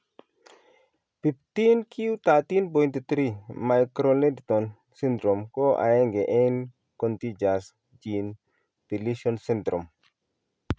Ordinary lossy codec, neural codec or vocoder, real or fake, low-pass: none; none; real; none